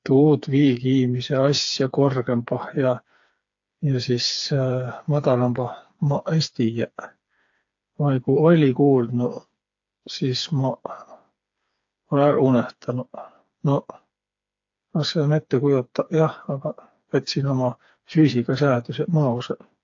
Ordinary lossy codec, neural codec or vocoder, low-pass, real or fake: AAC, 48 kbps; codec, 16 kHz, 4 kbps, FreqCodec, smaller model; 7.2 kHz; fake